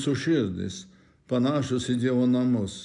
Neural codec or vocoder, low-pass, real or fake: none; 10.8 kHz; real